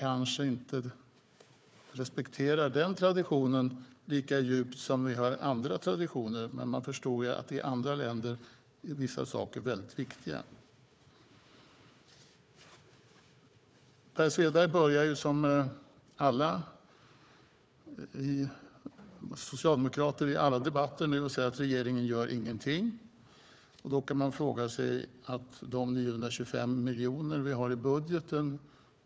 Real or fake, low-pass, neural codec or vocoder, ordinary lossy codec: fake; none; codec, 16 kHz, 4 kbps, FunCodec, trained on Chinese and English, 50 frames a second; none